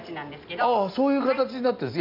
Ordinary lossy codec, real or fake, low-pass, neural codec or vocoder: none; real; 5.4 kHz; none